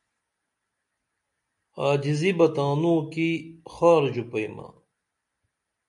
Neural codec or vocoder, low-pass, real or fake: none; 10.8 kHz; real